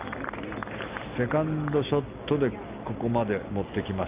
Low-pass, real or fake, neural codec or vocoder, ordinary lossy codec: 3.6 kHz; real; none; Opus, 32 kbps